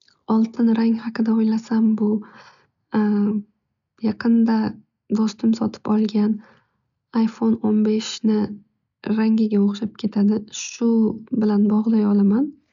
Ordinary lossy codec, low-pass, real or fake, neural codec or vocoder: MP3, 96 kbps; 7.2 kHz; real; none